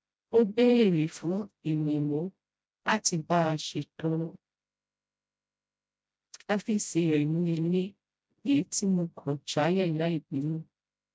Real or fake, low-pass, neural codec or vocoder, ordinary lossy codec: fake; none; codec, 16 kHz, 0.5 kbps, FreqCodec, smaller model; none